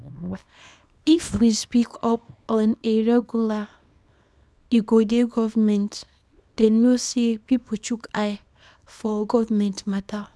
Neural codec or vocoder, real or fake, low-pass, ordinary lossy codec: codec, 24 kHz, 0.9 kbps, WavTokenizer, small release; fake; none; none